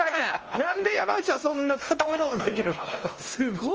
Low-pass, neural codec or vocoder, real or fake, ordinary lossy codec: 7.2 kHz; codec, 16 kHz, 1 kbps, X-Codec, WavLM features, trained on Multilingual LibriSpeech; fake; Opus, 24 kbps